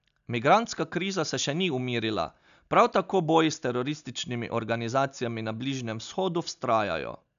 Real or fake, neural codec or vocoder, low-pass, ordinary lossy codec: real; none; 7.2 kHz; none